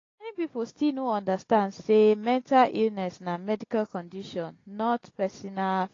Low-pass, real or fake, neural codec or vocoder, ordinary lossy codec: 7.2 kHz; real; none; AAC, 32 kbps